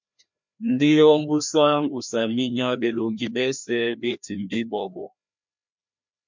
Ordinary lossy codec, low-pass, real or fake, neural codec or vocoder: MP3, 64 kbps; 7.2 kHz; fake; codec, 16 kHz, 1 kbps, FreqCodec, larger model